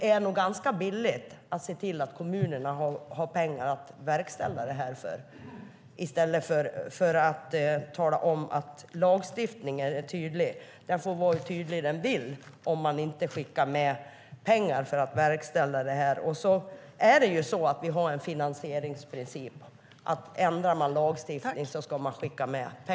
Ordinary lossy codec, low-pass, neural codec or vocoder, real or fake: none; none; none; real